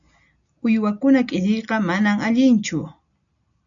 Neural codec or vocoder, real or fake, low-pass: none; real; 7.2 kHz